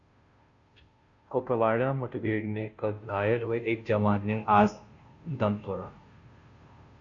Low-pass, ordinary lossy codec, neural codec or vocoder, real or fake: 7.2 kHz; Opus, 64 kbps; codec, 16 kHz, 0.5 kbps, FunCodec, trained on Chinese and English, 25 frames a second; fake